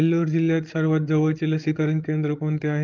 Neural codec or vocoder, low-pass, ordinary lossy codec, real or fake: codec, 16 kHz, 16 kbps, FreqCodec, larger model; 7.2 kHz; Opus, 32 kbps; fake